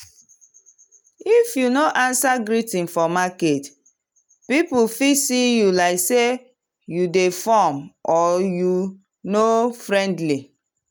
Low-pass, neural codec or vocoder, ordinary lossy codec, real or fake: none; none; none; real